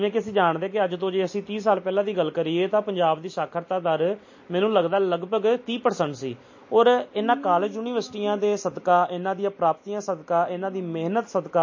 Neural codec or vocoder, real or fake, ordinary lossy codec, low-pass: none; real; MP3, 32 kbps; 7.2 kHz